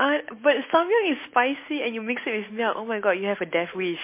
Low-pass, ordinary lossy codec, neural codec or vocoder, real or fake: 3.6 kHz; MP3, 24 kbps; none; real